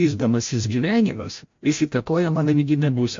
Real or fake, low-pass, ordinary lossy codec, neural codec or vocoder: fake; 7.2 kHz; MP3, 48 kbps; codec, 16 kHz, 0.5 kbps, FreqCodec, larger model